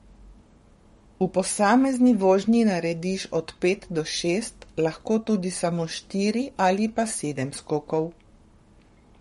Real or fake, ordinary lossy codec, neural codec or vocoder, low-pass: fake; MP3, 48 kbps; codec, 44.1 kHz, 7.8 kbps, Pupu-Codec; 19.8 kHz